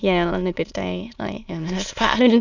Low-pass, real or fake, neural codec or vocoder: 7.2 kHz; fake; autoencoder, 22.05 kHz, a latent of 192 numbers a frame, VITS, trained on many speakers